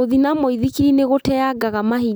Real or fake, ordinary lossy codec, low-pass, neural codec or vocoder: real; none; none; none